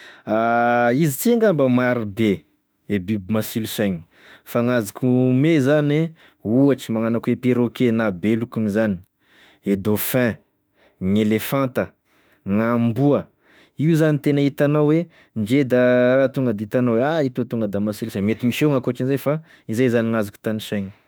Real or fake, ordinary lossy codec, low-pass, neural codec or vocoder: fake; none; none; autoencoder, 48 kHz, 32 numbers a frame, DAC-VAE, trained on Japanese speech